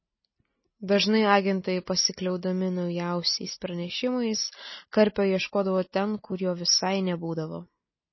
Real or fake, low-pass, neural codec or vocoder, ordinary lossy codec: real; 7.2 kHz; none; MP3, 24 kbps